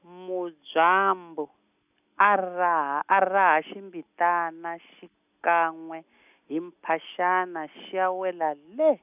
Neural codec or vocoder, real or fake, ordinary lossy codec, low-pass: none; real; none; 3.6 kHz